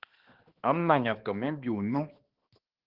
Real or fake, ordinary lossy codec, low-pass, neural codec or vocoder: fake; Opus, 16 kbps; 5.4 kHz; codec, 16 kHz, 2 kbps, X-Codec, HuBERT features, trained on balanced general audio